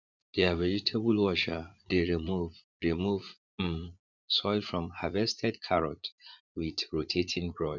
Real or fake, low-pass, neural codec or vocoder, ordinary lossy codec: fake; 7.2 kHz; vocoder, 44.1 kHz, 80 mel bands, Vocos; none